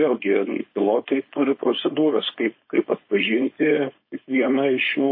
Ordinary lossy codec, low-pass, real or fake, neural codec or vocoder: MP3, 24 kbps; 5.4 kHz; fake; codec, 16 kHz, 4.8 kbps, FACodec